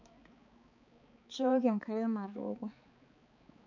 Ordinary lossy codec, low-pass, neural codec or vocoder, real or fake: none; 7.2 kHz; codec, 16 kHz, 4 kbps, X-Codec, HuBERT features, trained on balanced general audio; fake